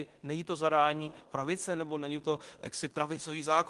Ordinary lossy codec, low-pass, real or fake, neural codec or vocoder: Opus, 24 kbps; 10.8 kHz; fake; codec, 16 kHz in and 24 kHz out, 0.9 kbps, LongCat-Audio-Codec, fine tuned four codebook decoder